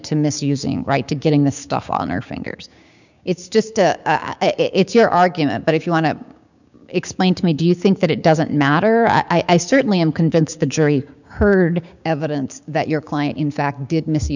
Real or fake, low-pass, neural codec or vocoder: fake; 7.2 kHz; codec, 16 kHz, 6 kbps, DAC